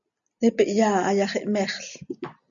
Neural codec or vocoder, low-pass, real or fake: none; 7.2 kHz; real